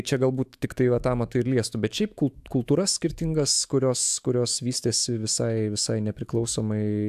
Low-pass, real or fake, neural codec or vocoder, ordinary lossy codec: 14.4 kHz; fake; autoencoder, 48 kHz, 128 numbers a frame, DAC-VAE, trained on Japanese speech; AAC, 96 kbps